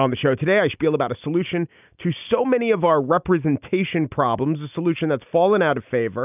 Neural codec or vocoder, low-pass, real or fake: none; 3.6 kHz; real